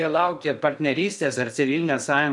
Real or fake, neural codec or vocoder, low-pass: fake; codec, 16 kHz in and 24 kHz out, 0.6 kbps, FocalCodec, streaming, 2048 codes; 10.8 kHz